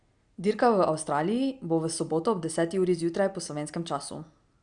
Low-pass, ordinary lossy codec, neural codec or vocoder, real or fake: 9.9 kHz; Opus, 64 kbps; none; real